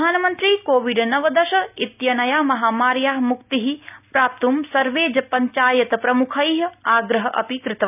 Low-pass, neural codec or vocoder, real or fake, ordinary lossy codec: 3.6 kHz; none; real; none